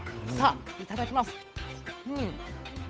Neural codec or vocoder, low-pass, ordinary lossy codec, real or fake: codec, 16 kHz, 8 kbps, FunCodec, trained on Chinese and English, 25 frames a second; none; none; fake